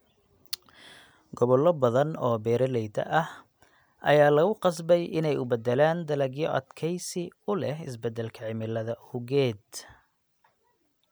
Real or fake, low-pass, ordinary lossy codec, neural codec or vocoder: real; none; none; none